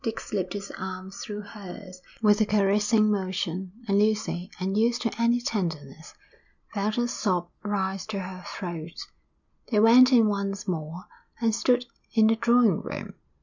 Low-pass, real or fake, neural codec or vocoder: 7.2 kHz; real; none